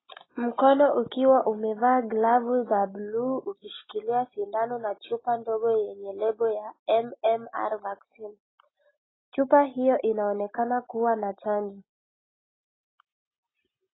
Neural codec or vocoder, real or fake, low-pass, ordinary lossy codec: none; real; 7.2 kHz; AAC, 16 kbps